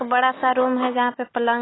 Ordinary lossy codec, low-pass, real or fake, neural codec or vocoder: AAC, 16 kbps; 7.2 kHz; real; none